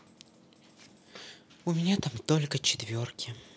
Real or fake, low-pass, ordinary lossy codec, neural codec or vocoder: real; none; none; none